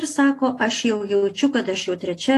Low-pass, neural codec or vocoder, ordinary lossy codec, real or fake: 14.4 kHz; none; AAC, 48 kbps; real